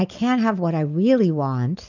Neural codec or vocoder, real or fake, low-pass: none; real; 7.2 kHz